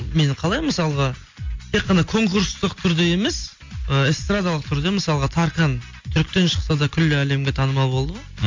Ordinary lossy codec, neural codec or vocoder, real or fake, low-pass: MP3, 48 kbps; none; real; 7.2 kHz